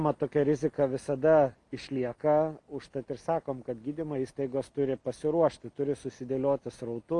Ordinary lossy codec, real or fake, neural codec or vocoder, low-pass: Opus, 32 kbps; real; none; 10.8 kHz